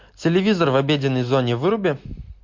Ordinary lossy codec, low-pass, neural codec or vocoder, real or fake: MP3, 48 kbps; 7.2 kHz; none; real